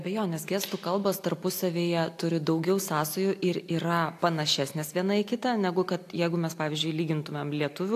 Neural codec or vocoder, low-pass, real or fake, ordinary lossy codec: none; 14.4 kHz; real; AAC, 64 kbps